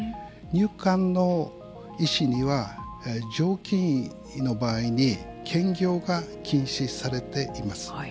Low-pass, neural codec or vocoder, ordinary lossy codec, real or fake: none; none; none; real